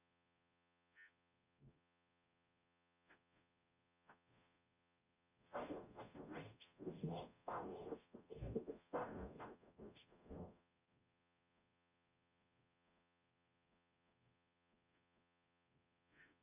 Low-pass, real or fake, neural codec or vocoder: 3.6 kHz; fake; codec, 44.1 kHz, 0.9 kbps, DAC